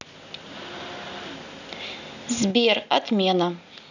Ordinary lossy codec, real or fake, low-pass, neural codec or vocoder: none; real; 7.2 kHz; none